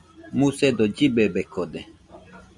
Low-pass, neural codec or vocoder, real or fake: 10.8 kHz; none; real